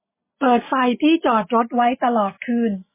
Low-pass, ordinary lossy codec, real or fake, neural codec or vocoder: 3.6 kHz; MP3, 16 kbps; real; none